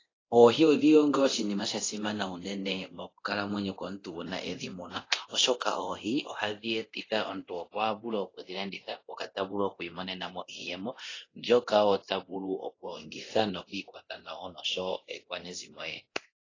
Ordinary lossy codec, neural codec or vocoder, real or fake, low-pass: AAC, 32 kbps; codec, 24 kHz, 0.9 kbps, DualCodec; fake; 7.2 kHz